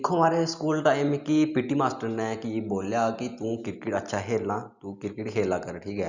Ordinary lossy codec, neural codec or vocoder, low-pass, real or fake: Opus, 64 kbps; none; 7.2 kHz; real